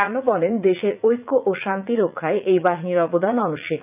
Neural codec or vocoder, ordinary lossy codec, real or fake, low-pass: vocoder, 44.1 kHz, 80 mel bands, Vocos; none; fake; 3.6 kHz